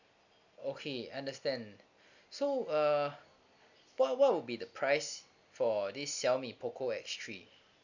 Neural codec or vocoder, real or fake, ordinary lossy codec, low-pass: none; real; none; 7.2 kHz